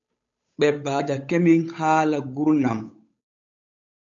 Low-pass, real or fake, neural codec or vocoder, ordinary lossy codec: 7.2 kHz; fake; codec, 16 kHz, 8 kbps, FunCodec, trained on Chinese and English, 25 frames a second; AAC, 64 kbps